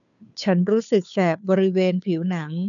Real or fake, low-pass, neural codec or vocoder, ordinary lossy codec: fake; 7.2 kHz; codec, 16 kHz, 2 kbps, FunCodec, trained on Chinese and English, 25 frames a second; none